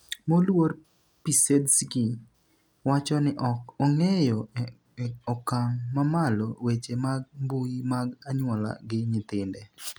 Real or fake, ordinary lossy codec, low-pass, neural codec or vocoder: real; none; none; none